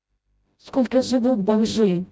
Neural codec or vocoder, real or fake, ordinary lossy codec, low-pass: codec, 16 kHz, 0.5 kbps, FreqCodec, smaller model; fake; none; none